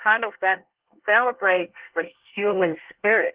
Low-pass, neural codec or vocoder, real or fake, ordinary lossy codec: 3.6 kHz; codec, 24 kHz, 1 kbps, SNAC; fake; Opus, 16 kbps